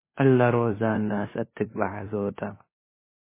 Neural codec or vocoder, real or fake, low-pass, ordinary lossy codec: codec, 16 kHz, 2 kbps, FunCodec, trained on LibriTTS, 25 frames a second; fake; 3.6 kHz; MP3, 16 kbps